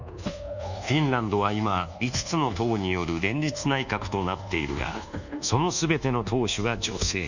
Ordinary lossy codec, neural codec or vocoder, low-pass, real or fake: none; codec, 24 kHz, 1.2 kbps, DualCodec; 7.2 kHz; fake